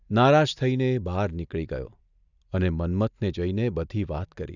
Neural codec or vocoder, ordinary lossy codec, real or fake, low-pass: none; none; real; 7.2 kHz